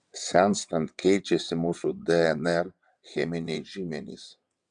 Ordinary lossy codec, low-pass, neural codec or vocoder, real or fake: MP3, 96 kbps; 9.9 kHz; vocoder, 22.05 kHz, 80 mel bands, WaveNeXt; fake